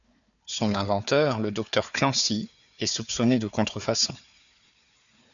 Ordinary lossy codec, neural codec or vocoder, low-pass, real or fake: MP3, 96 kbps; codec, 16 kHz, 4 kbps, FunCodec, trained on Chinese and English, 50 frames a second; 7.2 kHz; fake